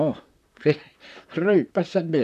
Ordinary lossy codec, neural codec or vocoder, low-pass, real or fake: none; vocoder, 44.1 kHz, 128 mel bands, Pupu-Vocoder; 14.4 kHz; fake